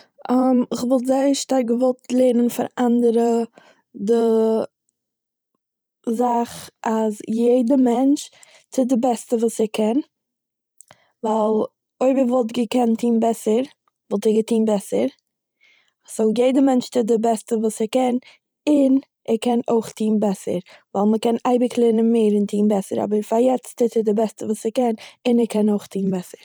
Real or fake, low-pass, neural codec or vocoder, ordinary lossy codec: fake; none; vocoder, 44.1 kHz, 128 mel bands every 512 samples, BigVGAN v2; none